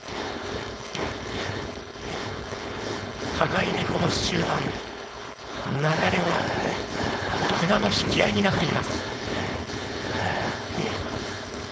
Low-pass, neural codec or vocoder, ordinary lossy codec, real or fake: none; codec, 16 kHz, 4.8 kbps, FACodec; none; fake